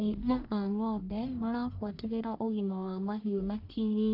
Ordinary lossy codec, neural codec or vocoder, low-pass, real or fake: none; codec, 44.1 kHz, 1.7 kbps, Pupu-Codec; 5.4 kHz; fake